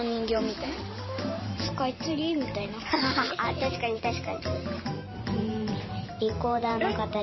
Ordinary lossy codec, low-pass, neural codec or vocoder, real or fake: MP3, 24 kbps; 7.2 kHz; vocoder, 44.1 kHz, 128 mel bands every 512 samples, BigVGAN v2; fake